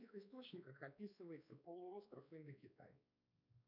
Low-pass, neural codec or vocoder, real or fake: 5.4 kHz; codec, 16 kHz, 2 kbps, X-Codec, WavLM features, trained on Multilingual LibriSpeech; fake